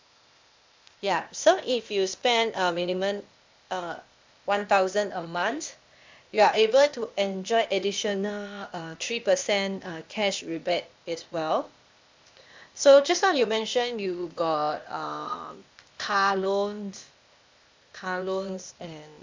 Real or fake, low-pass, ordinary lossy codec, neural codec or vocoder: fake; 7.2 kHz; MP3, 64 kbps; codec, 16 kHz, 0.8 kbps, ZipCodec